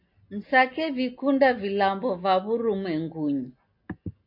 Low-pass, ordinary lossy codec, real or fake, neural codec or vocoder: 5.4 kHz; MP3, 32 kbps; real; none